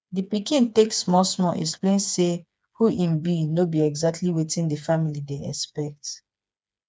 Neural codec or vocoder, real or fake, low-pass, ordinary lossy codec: codec, 16 kHz, 4 kbps, FreqCodec, smaller model; fake; none; none